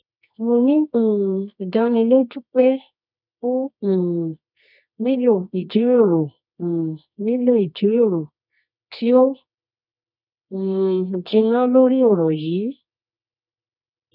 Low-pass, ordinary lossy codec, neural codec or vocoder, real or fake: 5.4 kHz; none; codec, 24 kHz, 0.9 kbps, WavTokenizer, medium music audio release; fake